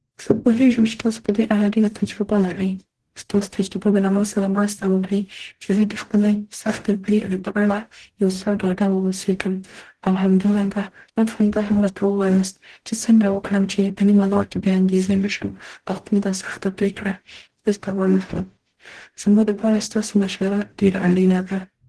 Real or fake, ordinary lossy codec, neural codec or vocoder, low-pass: fake; Opus, 16 kbps; codec, 44.1 kHz, 0.9 kbps, DAC; 10.8 kHz